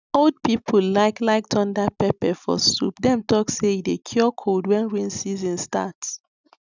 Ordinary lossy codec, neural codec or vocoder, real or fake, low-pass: none; none; real; 7.2 kHz